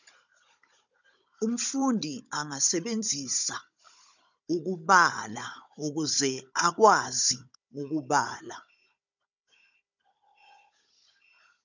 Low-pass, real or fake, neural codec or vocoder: 7.2 kHz; fake; codec, 16 kHz, 16 kbps, FunCodec, trained on Chinese and English, 50 frames a second